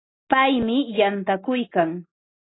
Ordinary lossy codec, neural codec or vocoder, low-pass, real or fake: AAC, 16 kbps; none; 7.2 kHz; real